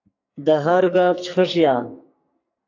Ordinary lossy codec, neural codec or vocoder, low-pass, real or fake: AAC, 48 kbps; codec, 44.1 kHz, 2.6 kbps, SNAC; 7.2 kHz; fake